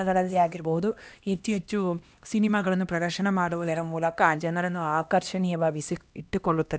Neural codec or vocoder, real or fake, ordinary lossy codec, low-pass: codec, 16 kHz, 1 kbps, X-Codec, HuBERT features, trained on LibriSpeech; fake; none; none